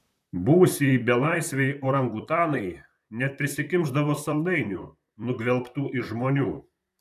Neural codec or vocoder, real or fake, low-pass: vocoder, 44.1 kHz, 128 mel bands, Pupu-Vocoder; fake; 14.4 kHz